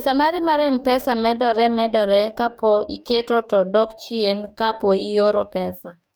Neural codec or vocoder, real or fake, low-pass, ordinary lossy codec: codec, 44.1 kHz, 2.6 kbps, DAC; fake; none; none